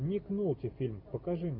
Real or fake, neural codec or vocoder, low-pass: real; none; 5.4 kHz